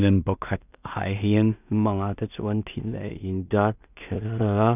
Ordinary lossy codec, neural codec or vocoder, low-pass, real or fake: none; codec, 16 kHz in and 24 kHz out, 0.4 kbps, LongCat-Audio-Codec, two codebook decoder; 3.6 kHz; fake